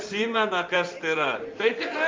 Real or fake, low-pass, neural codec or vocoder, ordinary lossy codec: fake; 7.2 kHz; codec, 16 kHz in and 24 kHz out, 2.2 kbps, FireRedTTS-2 codec; Opus, 32 kbps